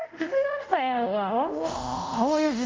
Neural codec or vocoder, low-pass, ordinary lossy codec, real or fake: codec, 24 kHz, 0.5 kbps, DualCodec; 7.2 kHz; Opus, 24 kbps; fake